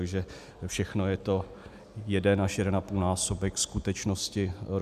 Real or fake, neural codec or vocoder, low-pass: real; none; 14.4 kHz